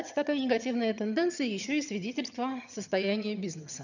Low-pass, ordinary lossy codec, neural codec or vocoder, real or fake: 7.2 kHz; none; vocoder, 22.05 kHz, 80 mel bands, HiFi-GAN; fake